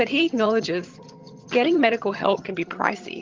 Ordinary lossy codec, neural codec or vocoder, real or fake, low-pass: Opus, 32 kbps; vocoder, 22.05 kHz, 80 mel bands, HiFi-GAN; fake; 7.2 kHz